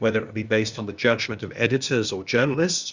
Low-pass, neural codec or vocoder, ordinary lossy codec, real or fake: 7.2 kHz; codec, 16 kHz, 0.8 kbps, ZipCodec; Opus, 64 kbps; fake